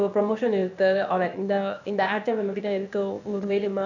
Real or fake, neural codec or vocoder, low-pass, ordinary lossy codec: fake; codec, 16 kHz, 0.8 kbps, ZipCodec; 7.2 kHz; none